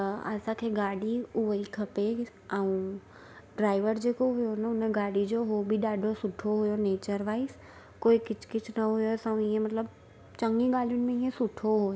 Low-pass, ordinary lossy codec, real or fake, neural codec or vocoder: none; none; real; none